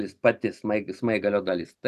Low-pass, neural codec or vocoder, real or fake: 14.4 kHz; none; real